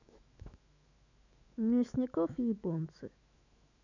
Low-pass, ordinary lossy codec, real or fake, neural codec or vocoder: 7.2 kHz; none; fake; autoencoder, 48 kHz, 128 numbers a frame, DAC-VAE, trained on Japanese speech